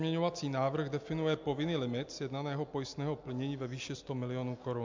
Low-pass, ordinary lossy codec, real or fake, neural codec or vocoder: 7.2 kHz; MP3, 64 kbps; real; none